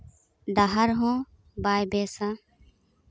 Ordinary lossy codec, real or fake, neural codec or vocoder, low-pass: none; real; none; none